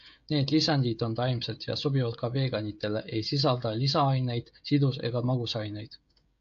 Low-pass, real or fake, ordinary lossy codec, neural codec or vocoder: 7.2 kHz; fake; AAC, 64 kbps; codec, 16 kHz, 16 kbps, FreqCodec, smaller model